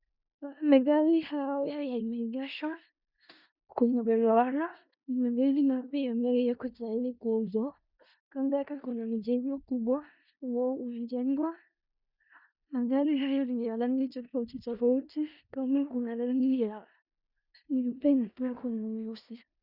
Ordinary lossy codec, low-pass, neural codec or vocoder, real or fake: Opus, 64 kbps; 5.4 kHz; codec, 16 kHz in and 24 kHz out, 0.4 kbps, LongCat-Audio-Codec, four codebook decoder; fake